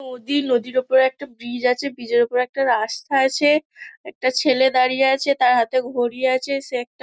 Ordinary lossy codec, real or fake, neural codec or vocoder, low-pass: none; real; none; none